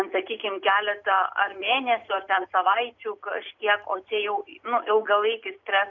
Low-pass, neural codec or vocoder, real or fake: 7.2 kHz; none; real